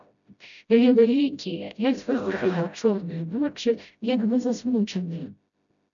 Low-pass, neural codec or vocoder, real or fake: 7.2 kHz; codec, 16 kHz, 0.5 kbps, FreqCodec, smaller model; fake